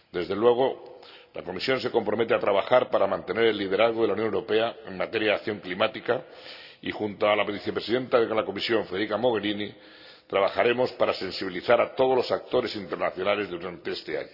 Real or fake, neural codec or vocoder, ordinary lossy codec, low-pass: real; none; none; 5.4 kHz